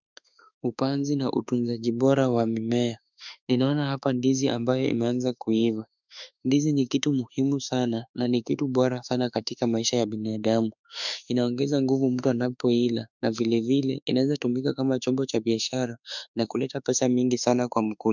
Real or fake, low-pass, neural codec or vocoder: fake; 7.2 kHz; autoencoder, 48 kHz, 32 numbers a frame, DAC-VAE, trained on Japanese speech